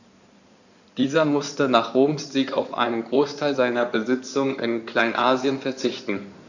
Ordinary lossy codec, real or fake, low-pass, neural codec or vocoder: none; fake; 7.2 kHz; codec, 16 kHz in and 24 kHz out, 2.2 kbps, FireRedTTS-2 codec